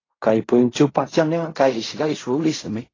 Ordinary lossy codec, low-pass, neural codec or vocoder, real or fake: AAC, 32 kbps; 7.2 kHz; codec, 16 kHz in and 24 kHz out, 0.4 kbps, LongCat-Audio-Codec, fine tuned four codebook decoder; fake